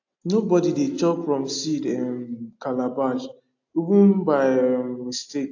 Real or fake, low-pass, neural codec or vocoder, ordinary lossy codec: real; 7.2 kHz; none; AAC, 48 kbps